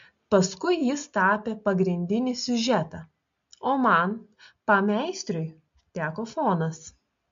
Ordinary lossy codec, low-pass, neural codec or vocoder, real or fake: MP3, 48 kbps; 7.2 kHz; none; real